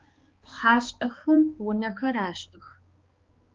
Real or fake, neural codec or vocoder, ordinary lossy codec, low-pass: fake; codec, 16 kHz, 2 kbps, X-Codec, HuBERT features, trained on balanced general audio; Opus, 32 kbps; 7.2 kHz